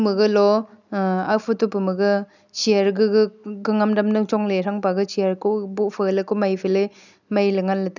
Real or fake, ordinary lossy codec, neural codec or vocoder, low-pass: real; none; none; 7.2 kHz